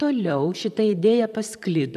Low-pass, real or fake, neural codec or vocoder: 14.4 kHz; fake; vocoder, 44.1 kHz, 128 mel bands, Pupu-Vocoder